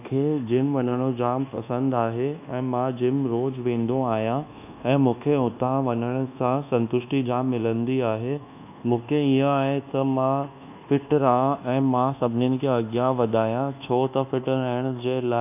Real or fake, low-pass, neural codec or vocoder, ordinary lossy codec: fake; 3.6 kHz; codec, 24 kHz, 1.2 kbps, DualCodec; none